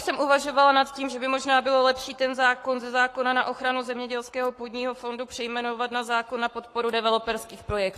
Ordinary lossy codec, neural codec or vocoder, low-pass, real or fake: AAC, 48 kbps; codec, 44.1 kHz, 7.8 kbps, Pupu-Codec; 14.4 kHz; fake